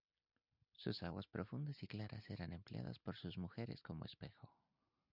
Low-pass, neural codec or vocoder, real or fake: 5.4 kHz; none; real